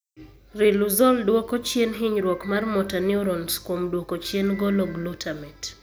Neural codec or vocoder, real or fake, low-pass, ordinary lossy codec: none; real; none; none